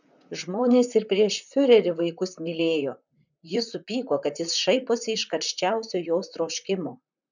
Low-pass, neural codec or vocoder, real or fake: 7.2 kHz; vocoder, 44.1 kHz, 128 mel bands, Pupu-Vocoder; fake